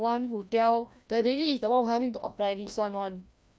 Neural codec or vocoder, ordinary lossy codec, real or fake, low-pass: codec, 16 kHz, 1 kbps, FreqCodec, larger model; none; fake; none